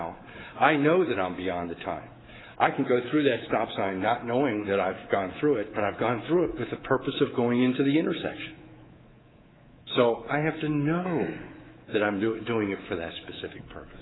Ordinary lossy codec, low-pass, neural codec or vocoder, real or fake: AAC, 16 kbps; 7.2 kHz; codec, 24 kHz, 3.1 kbps, DualCodec; fake